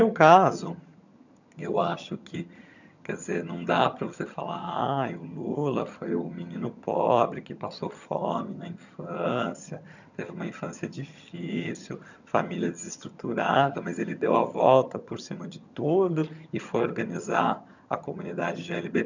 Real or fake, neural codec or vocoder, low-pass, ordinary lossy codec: fake; vocoder, 22.05 kHz, 80 mel bands, HiFi-GAN; 7.2 kHz; none